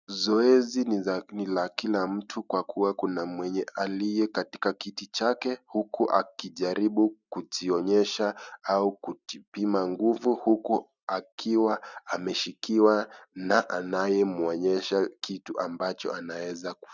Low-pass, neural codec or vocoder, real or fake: 7.2 kHz; none; real